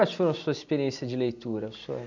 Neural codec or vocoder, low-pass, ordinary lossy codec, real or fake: none; 7.2 kHz; none; real